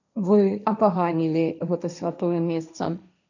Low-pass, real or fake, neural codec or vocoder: 7.2 kHz; fake; codec, 16 kHz, 1.1 kbps, Voila-Tokenizer